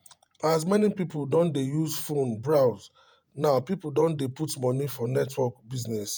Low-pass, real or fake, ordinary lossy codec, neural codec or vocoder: none; fake; none; vocoder, 48 kHz, 128 mel bands, Vocos